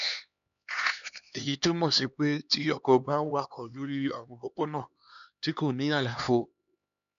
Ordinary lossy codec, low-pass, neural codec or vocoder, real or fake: none; 7.2 kHz; codec, 16 kHz, 2 kbps, X-Codec, HuBERT features, trained on LibriSpeech; fake